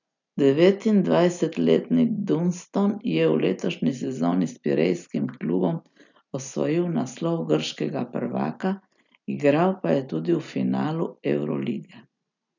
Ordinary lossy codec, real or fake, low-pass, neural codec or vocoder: none; real; 7.2 kHz; none